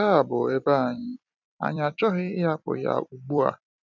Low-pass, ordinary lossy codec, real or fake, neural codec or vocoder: 7.2 kHz; none; fake; vocoder, 44.1 kHz, 128 mel bands every 256 samples, BigVGAN v2